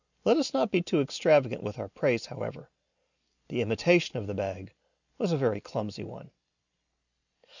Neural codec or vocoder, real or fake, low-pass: none; real; 7.2 kHz